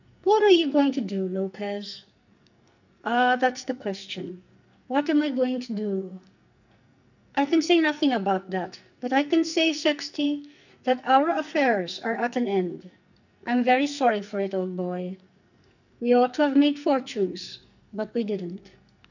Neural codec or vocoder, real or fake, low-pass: codec, 44.1 kHz, 2.6 kbps, SNAC; fake; 7.2 kHz